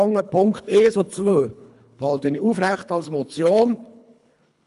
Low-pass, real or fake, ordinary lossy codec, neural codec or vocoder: 10.8 kHz; fake; none; codec, 24 kHz, 3 kbps, HILCodec